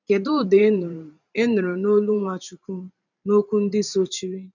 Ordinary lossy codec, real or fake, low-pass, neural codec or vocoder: none; fake; 7.2 kHz; vocoder, 44.1 kHz, 128 mel bands every 512 samples, BigVGAN v2